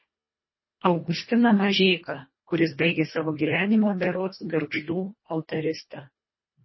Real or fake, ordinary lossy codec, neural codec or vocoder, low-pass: fake; MP3, 24 kbps; codec, 24 kHz, 1.5 kbps, HILCodec; 7.2 kHz